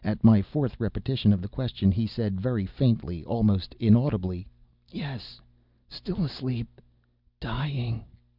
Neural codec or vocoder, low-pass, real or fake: none; 5.4 kHz; real